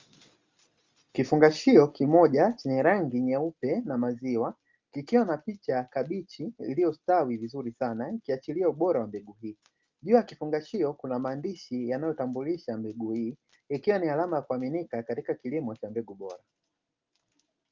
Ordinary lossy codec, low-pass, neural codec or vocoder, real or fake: Opus, 32 kbps; 7.2 kHz; none; real